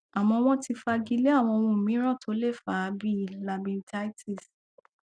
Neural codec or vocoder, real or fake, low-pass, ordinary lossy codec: none; real; 9.9 kHz; none